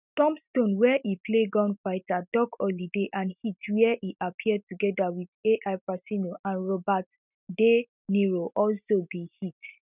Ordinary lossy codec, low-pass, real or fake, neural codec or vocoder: none; 3.6 kHz; real; none